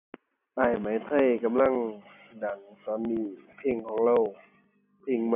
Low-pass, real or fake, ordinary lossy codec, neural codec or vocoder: 3.6 kHz; real; none; none